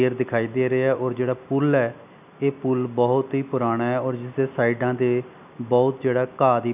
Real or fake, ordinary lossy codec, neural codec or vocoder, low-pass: real; none; none; 3.6 kHz